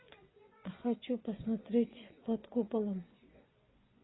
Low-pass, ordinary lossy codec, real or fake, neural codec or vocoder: 7.2 kHz; AAC, 16 kbps; fake; vocoder, 24 kHz, 100 mel bands, Vocos